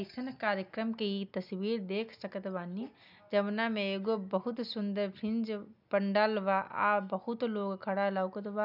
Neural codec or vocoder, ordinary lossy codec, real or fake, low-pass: none; none; real; 5.4 kHz